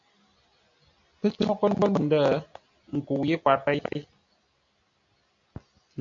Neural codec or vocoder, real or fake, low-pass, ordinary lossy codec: none; real; 7.2 kHz; AAC, 64 kbps